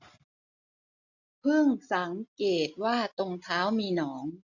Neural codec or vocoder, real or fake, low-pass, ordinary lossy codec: none; real; 7.2 kHz; none